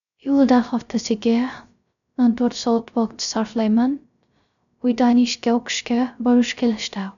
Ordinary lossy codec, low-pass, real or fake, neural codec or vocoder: none; 7.2 kHz; fake; codec, 16 kHz, 0.3 kbps, FocalCodec